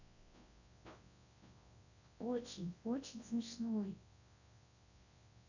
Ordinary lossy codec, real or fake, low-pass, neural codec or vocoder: none; fake; 7.2 kHz; codec, 24 kHz, 0.9 kbps, WavTokenizer, large speech release